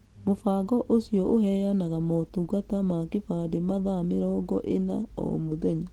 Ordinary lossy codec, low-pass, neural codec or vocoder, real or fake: Opus, 16 kbps; 19.8 kHz; none; real